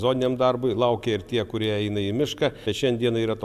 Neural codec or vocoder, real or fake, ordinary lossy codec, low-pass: none; real; AAC, 96 kbps; 14.4 kHz